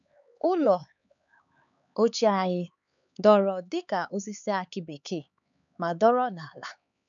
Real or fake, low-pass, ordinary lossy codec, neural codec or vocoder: fake; 7.2 kHz; none; codec, 16 kHz, 4 kbps, X-Codec, HuBERT features, trained on LibriSpeech